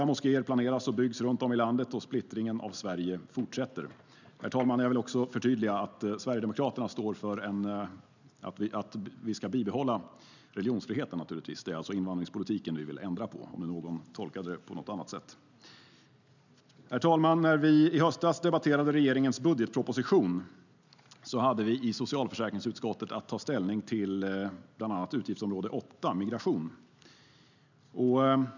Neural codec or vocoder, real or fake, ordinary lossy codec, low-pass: none; real; none; 7.2 kHz